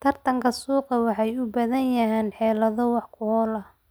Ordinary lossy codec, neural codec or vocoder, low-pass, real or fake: none; none; none; real